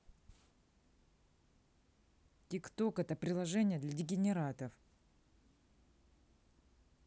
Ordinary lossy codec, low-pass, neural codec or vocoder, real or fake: none; none; none; real